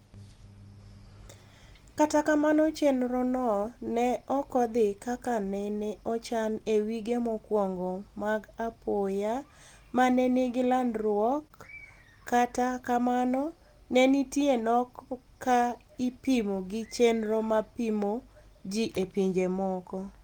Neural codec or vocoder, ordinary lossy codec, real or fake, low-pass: none; Opus, 24 kbps; real; 19.8 kHz